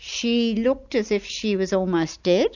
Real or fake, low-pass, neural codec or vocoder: real; 7.2 kHz; none